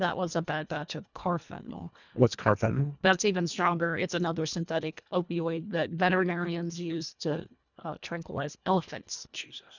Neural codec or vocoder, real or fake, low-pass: codec, 24 kHz, 1.5 kbps, HILCodec; fake; 7.2 kHz